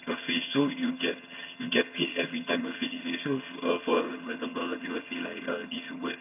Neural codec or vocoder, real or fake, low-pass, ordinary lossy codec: vocoder, 22.05 kHz, 80 mel bands, HiFi-GAN; fake; 3.6 kHz; none